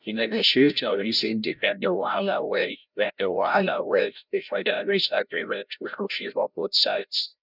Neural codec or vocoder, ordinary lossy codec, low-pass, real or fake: codec, 16 kHz, 0.5 kbps, FreqCodec, larger model; none; 5.4 kHz; fake